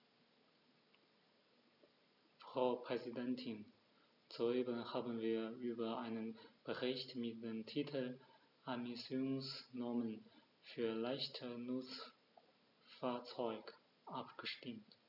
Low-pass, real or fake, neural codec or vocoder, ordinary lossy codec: 5.4 kHz; real; none; none